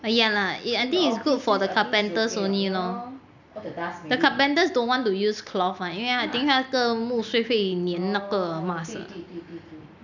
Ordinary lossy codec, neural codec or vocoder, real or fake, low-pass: none; none; real; 7.2 kHz